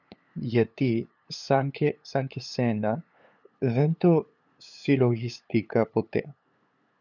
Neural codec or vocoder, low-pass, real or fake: codec, 16 kHz, 8 kbps, FunCodec, trained on LibriTTS, 25 frames a second; 7.2 kHz; fake